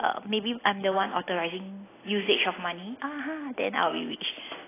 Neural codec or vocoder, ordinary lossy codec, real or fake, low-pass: none; AAC, 16 kbps; real; 3.6 kHz